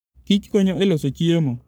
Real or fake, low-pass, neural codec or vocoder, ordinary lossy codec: fake; none; codec, 44.1 kHz, 3.4 kbps, Pupu-Codec; none